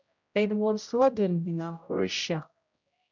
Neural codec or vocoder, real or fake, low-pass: codec, 16 kHz, 0.5 kbps, X-Codec, HuBERT features, trained on general audio; fake; 7.2 kHz